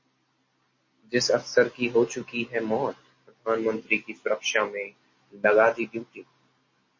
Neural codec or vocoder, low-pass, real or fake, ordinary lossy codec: none; 7.2 kHz; real; MP3, 32 kbps